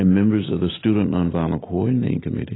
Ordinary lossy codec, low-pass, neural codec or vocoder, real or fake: AAC, 16 kbps; 7.2 kHz; none; real